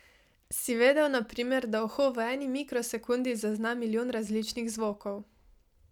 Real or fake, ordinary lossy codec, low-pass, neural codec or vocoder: real; none; 19.8 kHz; none